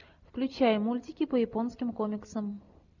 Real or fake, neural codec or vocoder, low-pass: real; none; 7.2 kHz